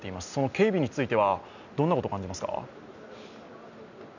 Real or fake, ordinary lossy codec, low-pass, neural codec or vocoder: real; none; 7.2 kHz; none